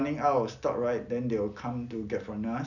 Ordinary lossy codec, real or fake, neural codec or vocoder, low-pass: none; real; none; 7.2 kHz